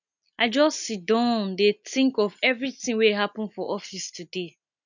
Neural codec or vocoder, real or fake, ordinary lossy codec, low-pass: none; real; none; 7.2 kHz